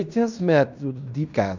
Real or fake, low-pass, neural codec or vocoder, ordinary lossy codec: fake; 7.2 kHz; codec, 16 kHz in and 24 kHz out, 0.9 kbps, LongCat-Audio-Codec, fine tuned four codebook decoder; none